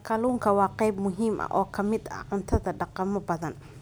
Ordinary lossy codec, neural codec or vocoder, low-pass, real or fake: none; none; none; real